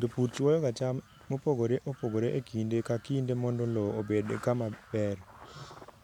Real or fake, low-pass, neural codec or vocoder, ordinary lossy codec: real; 19.8 kHz; none; none